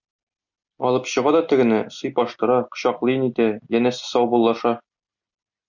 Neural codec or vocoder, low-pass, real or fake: none; 7.2 kHz; real